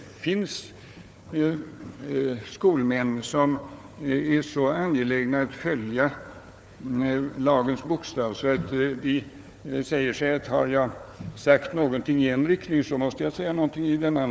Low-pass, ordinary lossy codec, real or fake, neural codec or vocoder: none; none; fake; codec, 16 kHz, 4 kbps, FunCodec, trained on Chinese and English, 50 frames a second